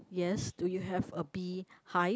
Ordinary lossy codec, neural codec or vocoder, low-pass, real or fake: none; none; none; real